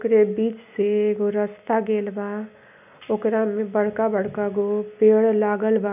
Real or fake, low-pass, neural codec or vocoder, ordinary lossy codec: real; 3.6 kHz; none; none